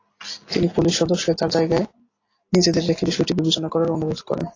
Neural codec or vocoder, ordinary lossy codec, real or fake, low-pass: none; AAC, 32 kbps; real; 7.2 kHz